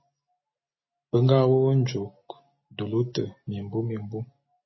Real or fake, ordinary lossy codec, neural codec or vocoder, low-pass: real; MP3, 24 kbps; none; 7.2 kHz